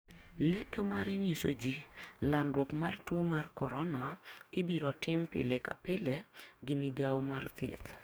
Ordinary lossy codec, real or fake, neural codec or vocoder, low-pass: none; fake; codec, 44.1 kHz, 2.6 kbps, DAC; none